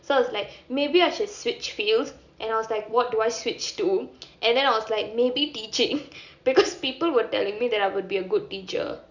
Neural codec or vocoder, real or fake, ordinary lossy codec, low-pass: none; real; none; 7.2 kHz